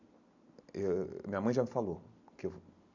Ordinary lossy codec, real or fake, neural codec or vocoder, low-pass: none; real; none; 7.2 kHz